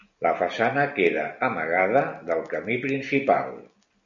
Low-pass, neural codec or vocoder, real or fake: 7.2 kHz; none; real